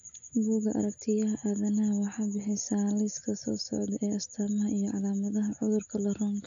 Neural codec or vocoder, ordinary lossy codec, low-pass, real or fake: none; AAC, 64 kbps; 7.2 kHz; real